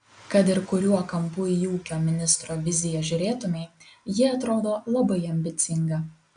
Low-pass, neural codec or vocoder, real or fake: 9.9 kHz; none; real